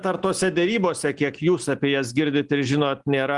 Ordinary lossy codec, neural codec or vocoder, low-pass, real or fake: Opus, 32 kbps; none; 10.8 kHz; real